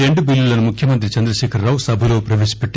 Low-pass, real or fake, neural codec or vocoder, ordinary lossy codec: none; real; none; none